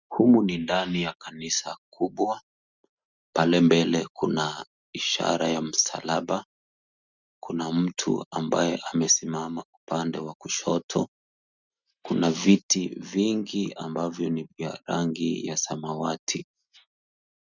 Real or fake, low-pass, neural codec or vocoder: real; 7.2 kHz; none